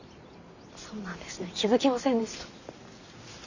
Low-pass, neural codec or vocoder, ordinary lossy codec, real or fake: 7.2 kHz; none; none; real